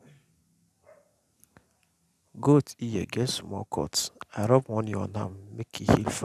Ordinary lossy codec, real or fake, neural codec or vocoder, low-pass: none; real; none; 14.4 kHz